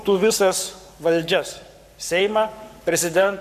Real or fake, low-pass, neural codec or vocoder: fake; 14.4 kHz; codec, 44.1 kHz, 7.8 kbps, Pupu-Codec